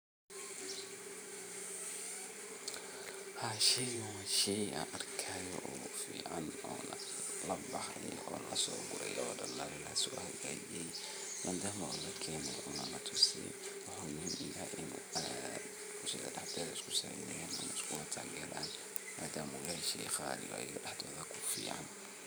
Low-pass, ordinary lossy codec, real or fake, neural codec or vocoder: none; none; fake; vocoder, 44.1 kHz, 128 mel bands every 512 samples, BigVGAN v2